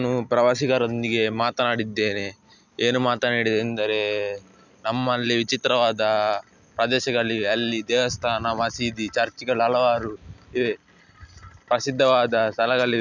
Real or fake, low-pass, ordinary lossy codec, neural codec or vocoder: real; 7.2 kHz; none; none